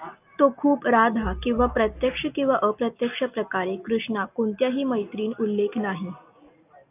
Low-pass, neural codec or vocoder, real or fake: 3.6 kHz; none; real